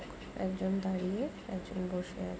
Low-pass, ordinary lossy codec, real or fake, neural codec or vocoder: none; none; real; none